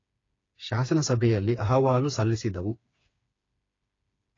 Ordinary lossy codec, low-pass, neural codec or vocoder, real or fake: AAC, 32 kbps; 7.2 kHz; codec, 16 kHz, 4 kbps, FreqCodec, smaller model; fake